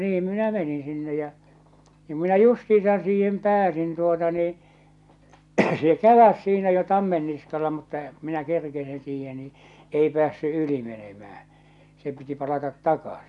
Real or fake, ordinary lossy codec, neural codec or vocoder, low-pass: real; none; none; 10.8 kHz